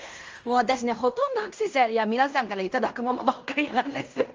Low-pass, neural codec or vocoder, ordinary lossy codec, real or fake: 7.2 kHz; codec, 16 kHz in and 24 kHz out, 0.9 kbps, LongCat-Audio-Codec, fine tuned four codebook decoder; Opus, 24 kbps; fake